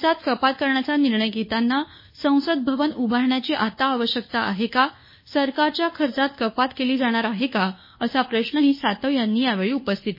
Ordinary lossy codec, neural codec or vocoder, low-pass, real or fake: MP3, 24 kbps; codec, 24 kHz, 1.2 kbps, DualCodec; 5.4 kHz; fake